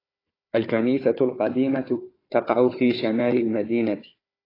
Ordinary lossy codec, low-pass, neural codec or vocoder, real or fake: AAC, 24 kbps; 5.4 kHz; codec, 16 kHz, 4 kbps, FunCodec, trained on Chinese and English, 50 frames a second; fake